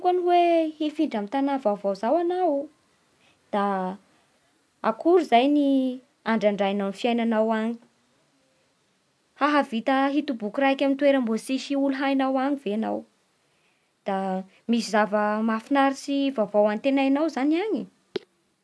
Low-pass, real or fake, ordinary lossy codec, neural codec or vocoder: none; real; none; none